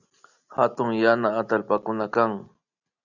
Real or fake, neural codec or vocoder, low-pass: real; none; 7.2 kHz